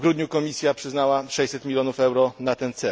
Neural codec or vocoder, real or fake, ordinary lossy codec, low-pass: none; real; none; none